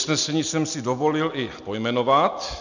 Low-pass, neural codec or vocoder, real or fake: 7.2 kHz; none; real